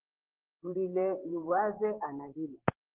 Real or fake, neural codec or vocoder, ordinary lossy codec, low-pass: fake; vocoder, 44.1 kHz, 128 mel bands, Pupu-Vocoder; Opus, 16 kbps; 3.6 kHz